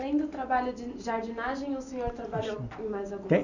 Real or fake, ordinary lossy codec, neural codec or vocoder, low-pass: real; none; none; 7.2 kHz